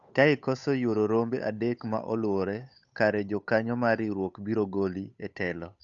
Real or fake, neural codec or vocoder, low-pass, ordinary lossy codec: fake; codec, 16 kHz, 8 kbps, FunCodec, trained on Chinese and English, 25 frames a second; 7.2 kHz; none